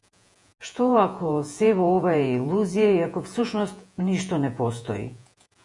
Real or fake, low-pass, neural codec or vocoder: fake; 10.8 kHz; vocoder, 48 kHz, 128 mel bands, Vocos